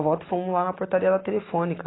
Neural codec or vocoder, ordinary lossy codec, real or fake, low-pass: none; AAC, 16 kbps; real; 7.2 kHz